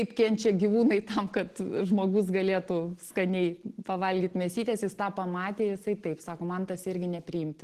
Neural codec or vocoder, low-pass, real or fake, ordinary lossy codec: none; 14.4 kHz; real; Opus, 16 kbps